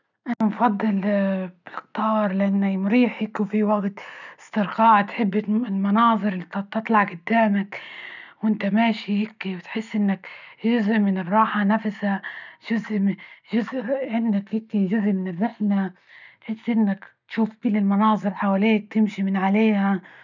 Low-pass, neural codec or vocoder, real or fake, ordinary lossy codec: 7.2 kHz; none; real; none